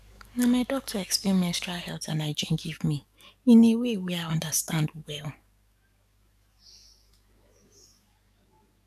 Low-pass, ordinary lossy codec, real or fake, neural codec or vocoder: 14.4 kHz; none; fake; codec, 44.1 kHz, 7.8 kbps, DAC